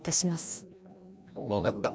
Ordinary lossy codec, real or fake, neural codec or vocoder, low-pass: none; fake; codec, 16 kHz, 1 kbps, FreqCodec, larger model; none